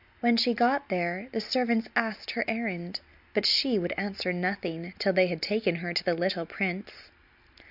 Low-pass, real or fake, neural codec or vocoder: 5.4 kHz; real; none